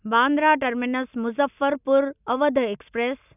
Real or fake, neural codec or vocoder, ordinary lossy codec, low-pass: real; none; none; 3.6 kHz